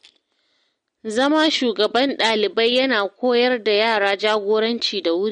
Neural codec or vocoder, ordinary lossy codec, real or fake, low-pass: none; MP3, 48 kbps; real; 9.9 kHz